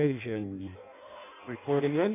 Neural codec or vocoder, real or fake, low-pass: codec, 16 kHz in and 24 kHz out, 0.6 kbps, FireRedTTS-2 codec; fake; 3.6 kHz